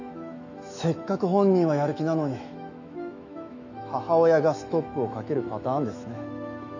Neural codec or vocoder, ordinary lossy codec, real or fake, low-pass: autoencoder, 48 kHz, 128 numbers a frame, DAC-VAE, trained on Japanese speech; none; fake; 7.2 kHz